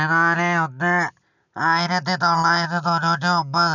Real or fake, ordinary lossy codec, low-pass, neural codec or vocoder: real; none; 7.2 kHz; none